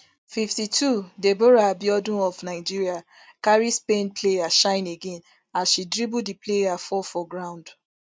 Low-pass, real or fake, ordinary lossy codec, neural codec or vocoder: none; real; none; none